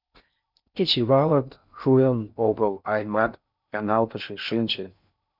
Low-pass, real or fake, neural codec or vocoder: 5.4 kHz; fake; codec, 16 kHz in and 24 kHz out, 0.6 kbps, FocalCodec, streaming, 4096 codes